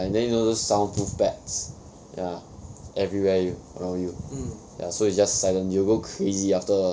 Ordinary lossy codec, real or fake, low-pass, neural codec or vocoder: none; real; none; none